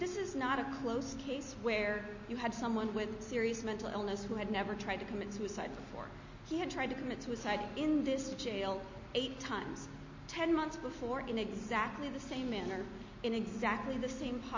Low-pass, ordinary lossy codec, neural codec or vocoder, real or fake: 7.2 kHz; MP3, 32 kbps; none; real